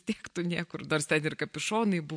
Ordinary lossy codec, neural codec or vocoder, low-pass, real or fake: MP3, 64 kbps; none; 9.9 kHz; real